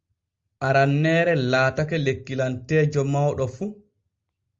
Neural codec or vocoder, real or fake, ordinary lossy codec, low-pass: none; real; Opus, 32 kbps; 7.2 kHz